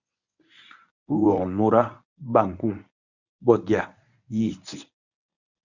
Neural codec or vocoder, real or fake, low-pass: codec, 24 kHz, 0.9 kbps, WavTokenizer, medium speech release version 1; fake; 7.2 kHz